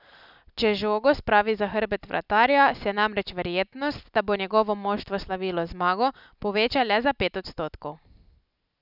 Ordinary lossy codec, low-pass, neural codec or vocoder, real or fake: none; 5.4 kHz; none; real